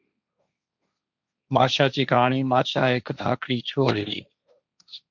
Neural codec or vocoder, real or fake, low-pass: codec, 16 kHz, 1.1 kbps, Voila-Tokenizer; fake; 7.2 kHz